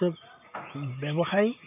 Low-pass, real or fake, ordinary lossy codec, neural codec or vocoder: 3.6 kHz; real; none; none